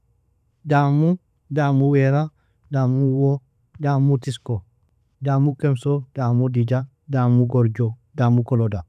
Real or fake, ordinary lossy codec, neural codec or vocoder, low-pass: fake; none; vocoder, 44.1 kHz, 128 mel bands every 512 samples, BigVGAN v2; 14.4 kHz